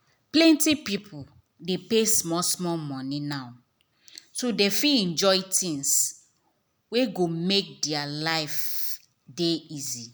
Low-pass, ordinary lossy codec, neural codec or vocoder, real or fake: none; none; none; real